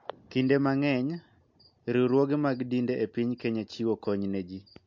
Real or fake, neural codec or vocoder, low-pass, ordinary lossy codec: real; none; 7.2 kHz; MP3, 48 kbps